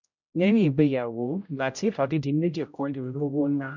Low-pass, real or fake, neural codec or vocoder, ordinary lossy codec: 7.2 kHz; fake; codec, 16 kHz, 0.5 kbps, X-Codec, HuBERT features, trained on general audio; none